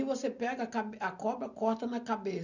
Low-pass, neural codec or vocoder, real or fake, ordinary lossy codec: 7.2 kHz; none; real; none